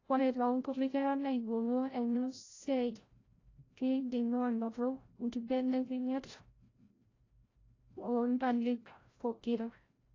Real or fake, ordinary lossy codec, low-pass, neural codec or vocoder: fake; AAC, 32 kbps; 7.2 kHz; codec, 16 kHz, 0.5 kbps, FreqCodec, larger model